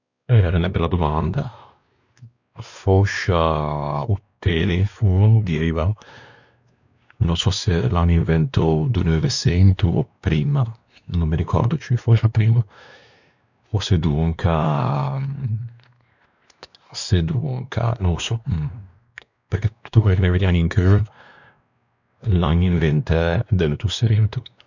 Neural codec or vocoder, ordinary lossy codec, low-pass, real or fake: codec, 16 kHz, 2 kbps, X-Codec, WavLM features, trained on Multilingual LibriSpeech; none; 7.2 kHz; fake